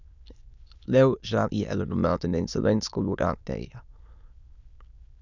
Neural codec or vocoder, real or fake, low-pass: autoencoder, 22.05 kHz, a latent of 192 numbers a frame, VITS, trained on many speakers; fake; 7.2 kHz